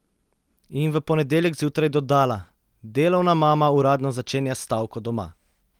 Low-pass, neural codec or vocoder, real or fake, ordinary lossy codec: 19.8 kHz; none; real; Opus, 24 kbps